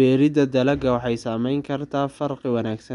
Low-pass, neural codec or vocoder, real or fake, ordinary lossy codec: 10.8 kHz; none; real; MP3, 64 kbps